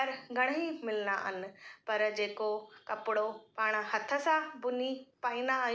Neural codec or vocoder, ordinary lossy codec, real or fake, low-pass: none; none; real; none